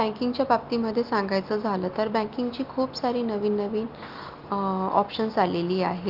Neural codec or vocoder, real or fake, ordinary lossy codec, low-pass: none; real; Opus, 32 kbps; 5.4 kHz